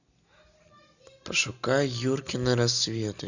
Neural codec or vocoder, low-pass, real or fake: none; 7.2 kHz; real